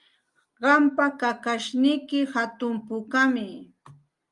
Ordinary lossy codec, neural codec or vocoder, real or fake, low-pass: Opus, 32 kbps; none; real; 10.8 kHz